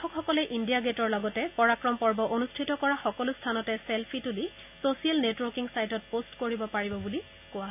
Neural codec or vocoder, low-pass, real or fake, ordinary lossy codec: none; 3.6 kHz; real; none